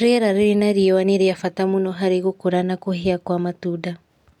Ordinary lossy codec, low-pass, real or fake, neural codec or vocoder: none; 19.8 kHz; real; none